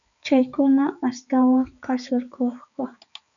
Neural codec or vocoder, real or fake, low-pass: codec, 16 kHz, 4 kbps, X-Codec, HuBERT features, trained on balanced general audio; fake; 7.2 kHz